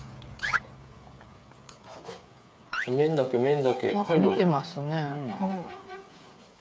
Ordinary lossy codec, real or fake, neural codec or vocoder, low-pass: none; fake; codec, 16 kHz, 8 kbps, FreqCodec, smaller model; none